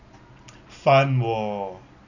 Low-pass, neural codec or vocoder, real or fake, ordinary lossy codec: 7.2 kHz; none; real; none